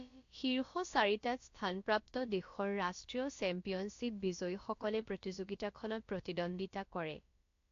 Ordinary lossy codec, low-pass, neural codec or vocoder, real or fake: AAC, 48 kbps; 7.2 kHz; codec, 16 kHz, about 1 kbps, DyCAST, with the encoder's durations; fake